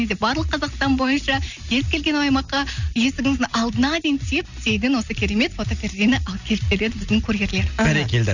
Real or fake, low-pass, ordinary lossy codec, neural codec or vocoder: real; 7.2 kHz; none; none